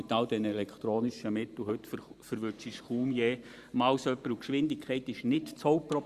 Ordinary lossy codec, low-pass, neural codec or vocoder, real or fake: Opus, 64 kbps; 14.4 kHz; vocoder, 44.1 kHz, 128 mel bands every 512 samples, BigVGAN v2; fake